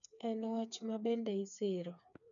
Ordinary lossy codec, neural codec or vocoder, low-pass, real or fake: none; codec, 16 kHz, 4 kbps, FreqCodec, smaller model; 7.2 kHz; fake